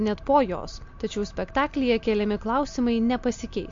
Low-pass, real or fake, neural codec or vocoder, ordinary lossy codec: 7.2 kHz; real; none; MP3, 48 kbps